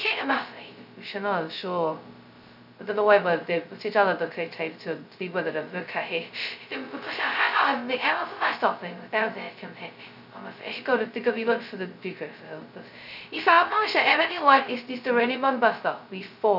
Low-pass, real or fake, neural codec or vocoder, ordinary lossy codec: 5.4 kHz; fake; codec, 16 kHz, 0.2 kbps, FocalCodec; none